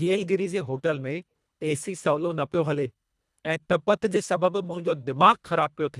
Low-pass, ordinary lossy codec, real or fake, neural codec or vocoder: none; none; fake; codec, 24 kHz, 1.5 kbps, HILCodec